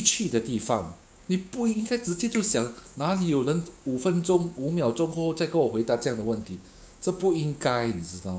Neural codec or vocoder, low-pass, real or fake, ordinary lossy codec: codec, 16 kHz, 6 kbps, DAC; none; fake; none